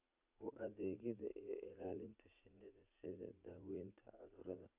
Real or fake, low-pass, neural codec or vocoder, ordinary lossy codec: fake; 3.6 kHz; vocoder, 44.1 kHz, 80 mel bands, Vocos; none